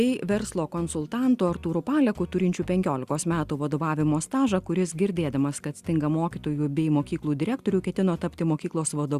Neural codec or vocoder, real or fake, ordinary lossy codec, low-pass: none; real; Opus, 64 kbps; 14.4 kHz